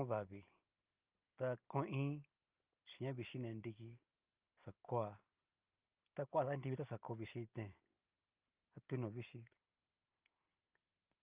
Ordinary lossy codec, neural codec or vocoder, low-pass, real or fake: Opus, 24 kbps; none; 3.6 kHz; real